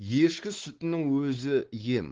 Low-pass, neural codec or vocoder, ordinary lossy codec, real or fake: 7.2 kHz; codec, 16 kHz, 4 kbps, X-Codec, WavLM features, trained on Multilingual LibriSpeech; Opus, 16 kbps; fake